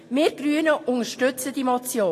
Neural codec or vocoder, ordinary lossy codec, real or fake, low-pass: none; AAC, 48 kbps; real; 14.4 kHz